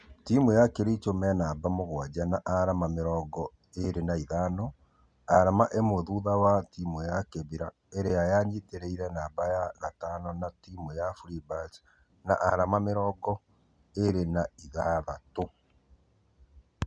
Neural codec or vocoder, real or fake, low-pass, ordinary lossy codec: none; real; 9.9 kHz; none